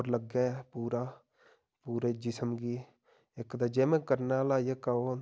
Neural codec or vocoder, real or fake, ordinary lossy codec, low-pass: none; real; none; none